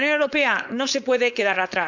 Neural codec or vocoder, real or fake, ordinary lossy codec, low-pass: codec, 16 kHz, 4.8 kbps, FACodec; fake; none; 7.2 kHz